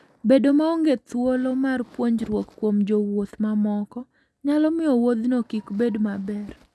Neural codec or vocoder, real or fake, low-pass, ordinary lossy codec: none; real; none; none